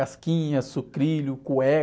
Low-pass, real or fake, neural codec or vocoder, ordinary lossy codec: none; real; none; none